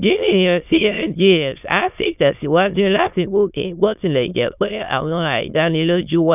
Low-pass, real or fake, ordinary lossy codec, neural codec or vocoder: 3.6 kHz; fake; none; autoencoder, 22.05 kHz, a latent of 192 numbers a frame, VITS, trained on many speakers